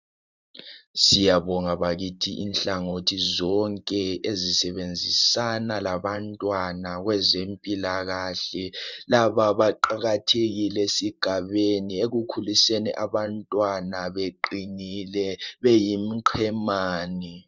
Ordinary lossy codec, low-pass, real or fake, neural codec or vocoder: Opus, 64 kbps; 7.2 kHz; fake; vocoder, 44.1 kHz, 128 mel bands every 512 samples, BigVGAN v2